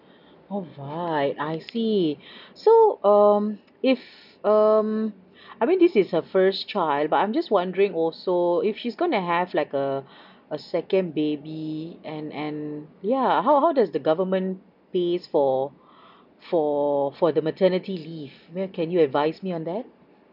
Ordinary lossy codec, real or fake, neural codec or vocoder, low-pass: none; real; none; 5.4 kHz